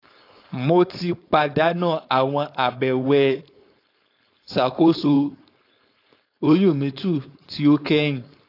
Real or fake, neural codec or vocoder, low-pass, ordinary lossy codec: fake; codec, 16 kHz, 4.8 kbps, FACodec; 5.4 kHz; AAC, 32 kbps